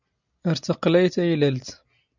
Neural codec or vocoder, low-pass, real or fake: none; 7.2 kHz; real